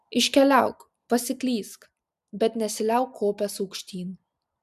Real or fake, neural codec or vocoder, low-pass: real; none; 14.4 kHz